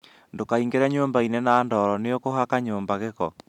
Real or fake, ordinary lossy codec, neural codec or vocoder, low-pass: real; none; none; 19.8 kHz